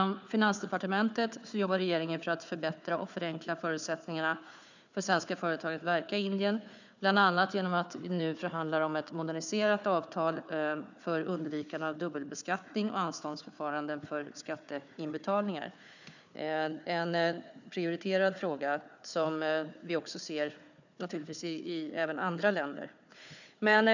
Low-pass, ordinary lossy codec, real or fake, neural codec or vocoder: 7.2 kHz; none; fake; codec, 16 kHz, 4 kbps, FunCodec, trained on Chinese and English, 50 frames a second